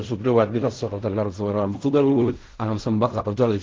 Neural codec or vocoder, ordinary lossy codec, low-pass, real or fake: codec, 16 kHz in and 24 kHz out, 0.4 kbps, LongCat-Audio-Codec, fine tuned four codebook decoder; Opus, 16 kbps; 7.2 kHz; fake